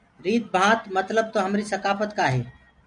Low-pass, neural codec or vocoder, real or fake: 9.9 kHz; none; real